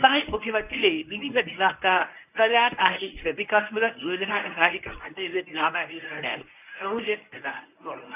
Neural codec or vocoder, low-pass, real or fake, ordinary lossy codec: codec, 24 kHz, 0.9 kbps, WavTokenizer, medium speech release version 1; 3.6 kHz; fake; none